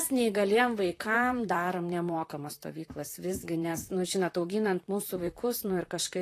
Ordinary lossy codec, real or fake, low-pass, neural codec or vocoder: AAC, 48 kbps; fake; 14.4 kHz; vocoder, 44.1 kHz, 128 mel bands, Pupu-Vocoder